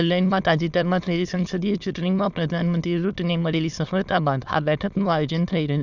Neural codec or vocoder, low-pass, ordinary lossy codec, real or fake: autoencoder, 22.05 kHz, a latent of 192 numbers a frame, VITS, trained on many speakers; 7.2 kHz; none; fake